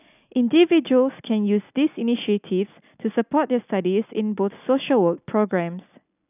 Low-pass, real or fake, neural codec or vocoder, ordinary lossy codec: 3.6 kHz; real; none; none